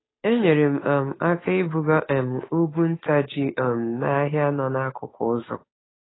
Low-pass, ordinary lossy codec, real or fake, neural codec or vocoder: 7.2 kHz; AAC, 16 kbps; fake; codec, 16 kHz, 8 kbps, FunCodec, trained on Chinese and English, 25 frames a second